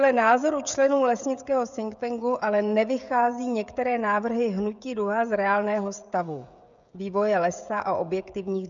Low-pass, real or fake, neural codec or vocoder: 7.2 kHz; fake; codec, 16 kHz, 16 kbps, FreqCodec, smaller model